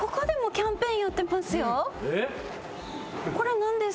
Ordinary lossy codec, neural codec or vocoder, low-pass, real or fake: none; none; none; real